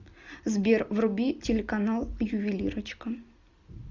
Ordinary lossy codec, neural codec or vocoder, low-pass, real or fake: Opus, 64 kbps; none; 7.2 kHz; real